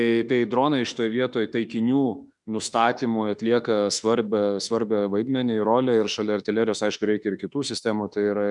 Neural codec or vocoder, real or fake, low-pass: autoencoder, 48 kHz, 32 numbers a frame, DAC-VAE, trained on Japanese speech; fake; 10.8 kHz